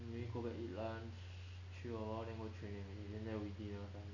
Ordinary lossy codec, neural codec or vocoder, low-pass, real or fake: none; none; 7.2 kHz; real